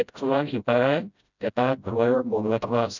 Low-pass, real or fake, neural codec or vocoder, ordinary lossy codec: 7.2 kHz; fake; codec, 16 kHz, 0.5 kbps, FreqCodec, smaller model; none